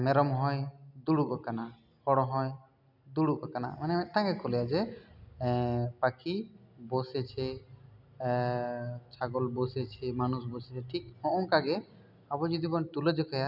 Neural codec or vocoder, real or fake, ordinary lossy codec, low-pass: none; real; none; 5.4 kHz